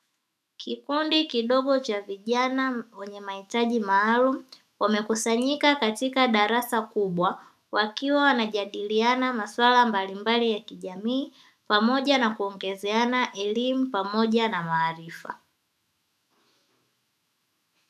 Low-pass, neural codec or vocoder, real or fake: 14.4 kHz; autoencoder, 48 kHz, 128 numbers a frame, DAC-VAE, trained on Japanese speech; fake